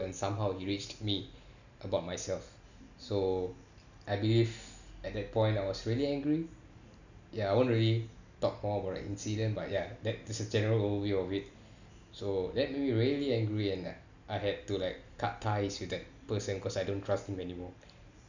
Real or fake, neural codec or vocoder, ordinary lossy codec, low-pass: real; none; none; 7.2 kHz